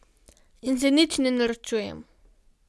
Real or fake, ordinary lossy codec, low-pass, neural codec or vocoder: real; none; none; none